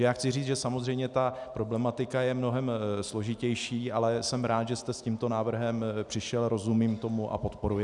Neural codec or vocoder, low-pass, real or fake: none; 10.8 kHz; real